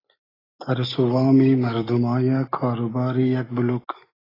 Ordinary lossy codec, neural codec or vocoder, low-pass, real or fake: AAC, 24 kbps; none; 5.4 kHz; real